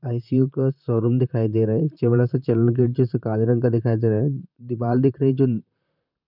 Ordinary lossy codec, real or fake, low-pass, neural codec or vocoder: none; fake; 5.4 kHz; vocoder, 44.1 kHz, 128 mel bands, Pupu-Vocoder